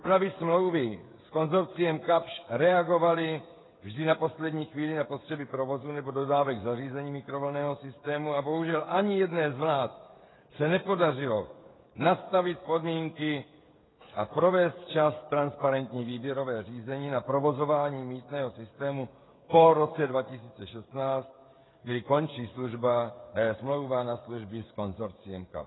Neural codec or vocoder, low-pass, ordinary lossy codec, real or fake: codec, 16 kHz, 16 kbps, FreqCodec, smaller model; 7.2 kHz; AAC, 16 kbps; fake